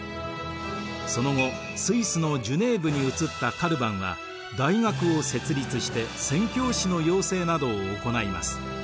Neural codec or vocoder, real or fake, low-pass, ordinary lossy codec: none; real; none; none